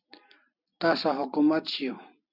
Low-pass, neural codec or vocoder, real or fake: 5.4 kHz; none; real